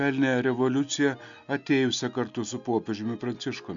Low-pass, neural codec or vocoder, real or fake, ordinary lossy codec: 7.2 kHz; none; real; MP3, 96 kbps